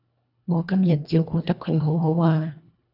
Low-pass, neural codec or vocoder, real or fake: 5.4 kHz; codec, 24 kHz, 1.5 kbps, HILCodec; fake